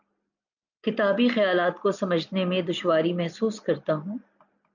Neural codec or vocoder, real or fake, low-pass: none; real; 7.2 kHz